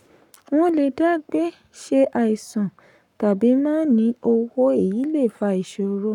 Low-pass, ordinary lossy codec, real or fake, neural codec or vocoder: 19.8 kHz; none; fake; codec, 44.1 kHz, 7.8 kbps, Pupu-Codec